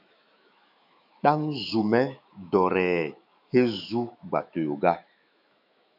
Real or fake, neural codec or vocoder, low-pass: fake; autoencoder, 48 kHz, 128 numbers a frame, DAC-VAE, trained on Japanese speech; 5.4 kHz